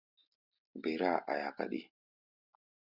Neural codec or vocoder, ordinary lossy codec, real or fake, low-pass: none; Opus, 64 kbps; real; 5.4 kHz